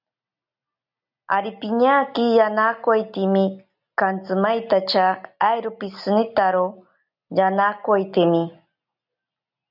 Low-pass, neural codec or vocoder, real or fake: 5.4 kHz; none; real